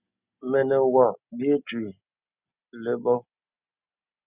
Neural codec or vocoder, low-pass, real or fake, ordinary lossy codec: none; 3.6 kHz; real; Opus, 64 kbps